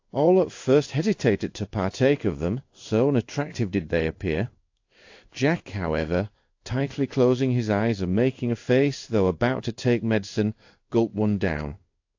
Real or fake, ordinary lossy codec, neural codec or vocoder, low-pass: fake; AAC, 48 kbps; codec, 16 kHz in and 24 kHz out, 1 kbps, XY-Tokenizer; 7.2 kHz